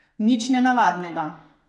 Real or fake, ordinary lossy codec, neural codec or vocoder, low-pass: fake; none; codec, 32 kHz, 1.9 kbps, SNAC; 10.8 kHz